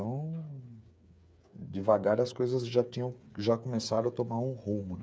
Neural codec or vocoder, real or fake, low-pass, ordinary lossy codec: codec, 16 kHz, 8 kbps, FreqCodec, smaller model; fake; none; none